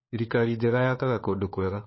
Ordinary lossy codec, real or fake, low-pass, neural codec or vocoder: MP3, 24 kbps; fake; 7.2 kHz; codec, 16 kHz, 4 kbps, FunCodec, trained on LibriTTS, 50 frames a second